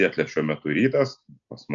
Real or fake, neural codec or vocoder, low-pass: real; none; 7.2 kHz